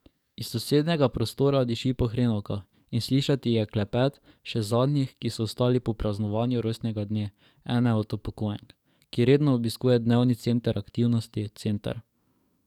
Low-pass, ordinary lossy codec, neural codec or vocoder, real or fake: 19.8 kHz; none; codec, 44.1 kHz, 7.8 kbps, DAC; fake